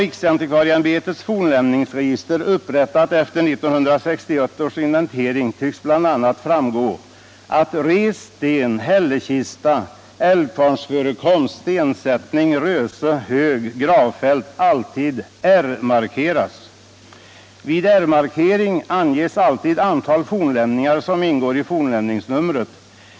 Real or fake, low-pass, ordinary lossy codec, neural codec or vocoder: real; none; none; none